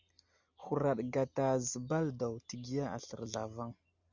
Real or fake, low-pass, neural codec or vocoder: real; 7.2 kHz; none